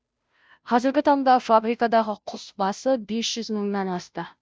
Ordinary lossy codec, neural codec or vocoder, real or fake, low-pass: none; codec, 16 kHz, 0.5 kbps, FunCodec, trained on Chinese and English, 25 frames a second; fake; none